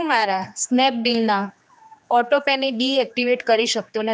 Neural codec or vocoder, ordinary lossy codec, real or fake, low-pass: codec, 16 kHz, 2 kbps, X-Codec, HuBERT features, trained on general audio; none; fake; none